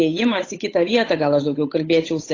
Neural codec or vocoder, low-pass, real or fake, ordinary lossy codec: codec, 16 kHz, 8 kbps, FunCodec, trained on Chinese and English, 25 frames a second; 7.2 kHz; fake; AAC, 32 kbps